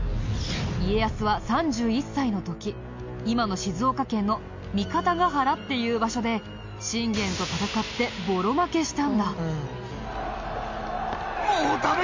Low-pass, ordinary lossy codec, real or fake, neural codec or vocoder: 7.2 kHz; MP3, 48 kbps; real; none